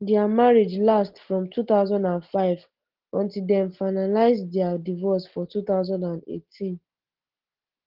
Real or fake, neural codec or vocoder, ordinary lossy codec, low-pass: real; none; Opus, 16 kbps; 5.4 kHz